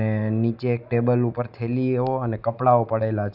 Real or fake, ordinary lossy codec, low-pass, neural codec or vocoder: real; none; 5.4 kHz; none